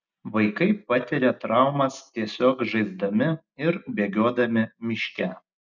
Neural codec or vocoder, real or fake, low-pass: none; real; 7.2 kHz